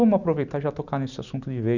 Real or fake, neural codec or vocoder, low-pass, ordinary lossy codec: real; none; 7.2 kHz; none